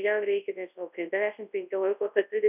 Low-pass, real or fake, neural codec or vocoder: 3.6 kHz; fake; codec, 24 kHz, 0.9 kbps, WavTokenizer, large speech release